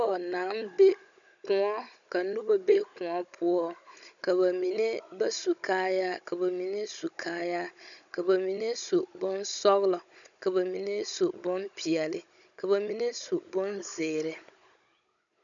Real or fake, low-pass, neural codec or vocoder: fake; 7.2 kHz; codec, 16 kHz, 16 kbps, FunCodec, trained on Chinese and English, 50 frames a second